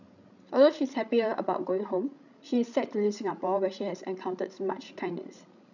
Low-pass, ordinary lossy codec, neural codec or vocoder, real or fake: 7.2 kHz; none; codec, 16 kHz, 16 kbps, FreqCodec, larger model; fake